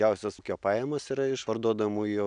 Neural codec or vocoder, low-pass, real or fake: none; 9.9 kHz; real